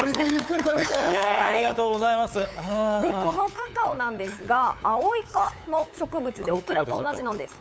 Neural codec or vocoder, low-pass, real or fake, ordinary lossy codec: codec, 16 kHz, 8 kbps, FunCodec, trained on LibriTTS, 25 frames a second; none; fake; none